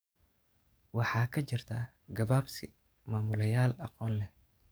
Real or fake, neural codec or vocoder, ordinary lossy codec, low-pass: fake; codec, 44.1 kHz, 7.8 kbps, DAC; none; none